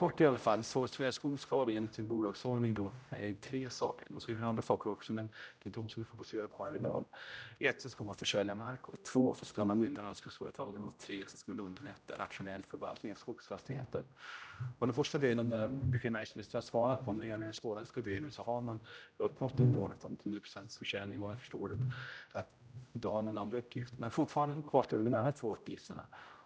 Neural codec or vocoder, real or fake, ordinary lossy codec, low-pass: codec, 16 kHz, 0.5 kbps, X-Codec, HuBERT features, trained on general audio; fake; none; none